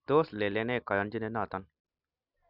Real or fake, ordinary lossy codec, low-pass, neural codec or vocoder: real; none; 5.4 kHz; none